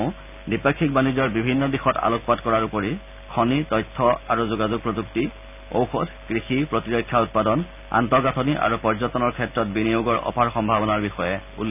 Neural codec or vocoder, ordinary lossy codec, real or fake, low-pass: none; none; real; 3.6 kHz